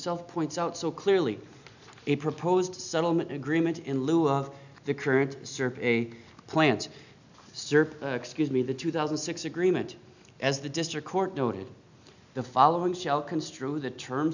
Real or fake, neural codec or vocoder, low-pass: real; none; 7.2 kHz